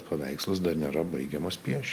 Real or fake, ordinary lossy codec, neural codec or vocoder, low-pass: real; Opus, 32 kbps; none; 14.4 kHz